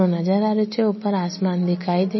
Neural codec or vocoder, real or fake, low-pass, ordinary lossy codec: none; real; 7.2 kHz; MP3, 24 kbps